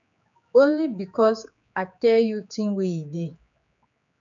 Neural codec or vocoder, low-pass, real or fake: codec, 16 kHz, 4 kbps, X-Codec, HuBERT features, trained on general audio; 7.2 kHz; fake